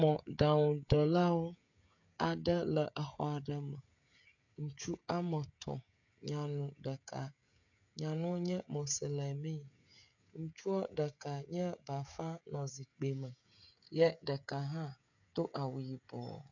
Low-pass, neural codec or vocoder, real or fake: 7.2 kHz; codec, 16 kHz, 16 kbps, FreqCodec, smaller model; fake